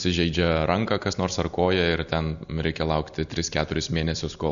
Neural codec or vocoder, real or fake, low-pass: none; real; 7.2 kHz